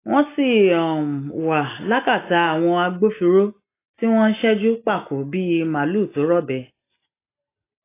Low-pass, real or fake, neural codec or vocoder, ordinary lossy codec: 3.6 kHz; real; none; AAC, 24 kbps